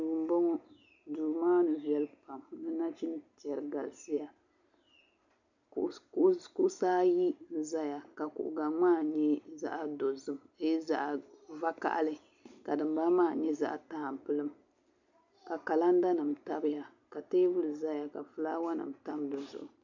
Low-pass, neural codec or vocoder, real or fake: 7.2 kHz; none; real